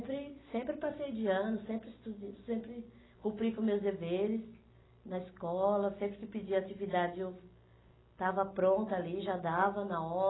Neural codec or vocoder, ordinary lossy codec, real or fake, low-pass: none; AAC, 16 kbps; real; 7.2 kHz